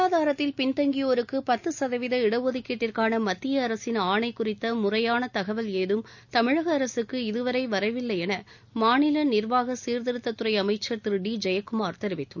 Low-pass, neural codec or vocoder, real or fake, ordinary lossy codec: 7.2 kHz; none; real; none